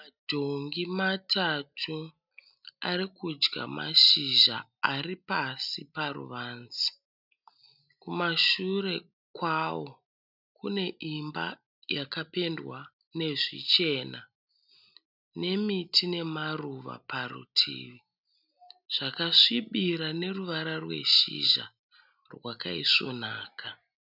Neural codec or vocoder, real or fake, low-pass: none; real; 5.4 kHz